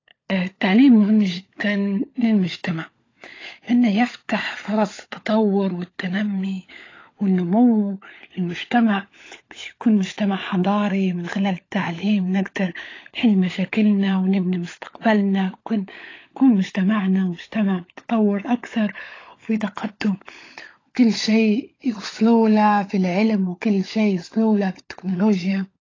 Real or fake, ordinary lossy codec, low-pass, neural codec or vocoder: fake; AAC, 32 kbps; 7.2 kHz; codec, 16 kHz, 16 kbps, FunCodec, trained on LibriTTS, 50 frames a second